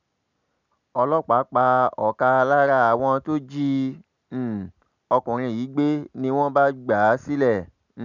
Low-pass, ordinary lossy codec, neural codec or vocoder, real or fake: 7.2 kHz; none; none; real